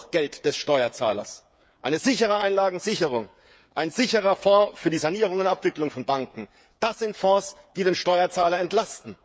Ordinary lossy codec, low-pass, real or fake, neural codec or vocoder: none; none; fake; codec, 16 kHz, 8 kbps, FreqCodec, smaller model